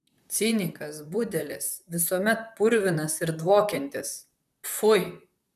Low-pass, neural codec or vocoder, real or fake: 14.4 kHz; vocoder, 44.1 kHz, 128 mel bands, Pupu-Vocoder; fake